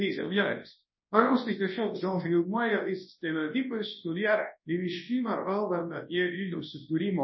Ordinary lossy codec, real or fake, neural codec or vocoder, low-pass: MP3, 24 kbps; fake; codec, 24 kHz, 0.9 kbps, WavTokenizer, large speech release; 7.2 kHz